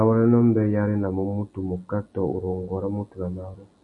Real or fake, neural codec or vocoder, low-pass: real; none; 10.8 kHz